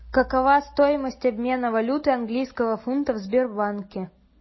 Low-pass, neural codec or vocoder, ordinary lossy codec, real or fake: 7.2 kHz; none; MP3, 24 kbps; real